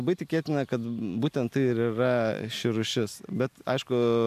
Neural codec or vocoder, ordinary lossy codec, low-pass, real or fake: none; MP3, 96 kbps; 14.4 kHz; real